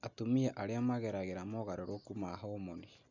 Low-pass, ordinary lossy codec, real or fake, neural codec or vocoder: 7.2 kHz; none; real; none